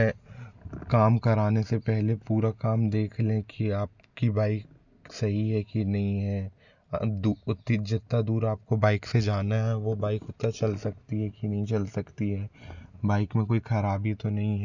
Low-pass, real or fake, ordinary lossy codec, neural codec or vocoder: 7.2 kHz; real; none; none